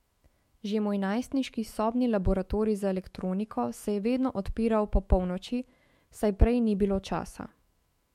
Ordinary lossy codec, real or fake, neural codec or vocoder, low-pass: MP3, 64 kbps; fake; autoencoder, 48 kHz, 128 numbers a frame, DAC-VAE, trained on Japanese speech; 19.8 kHz